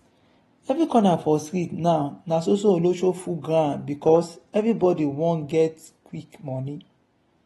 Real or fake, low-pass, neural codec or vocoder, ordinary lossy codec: real; 19.8 kHz; none; AAC, 32 kbps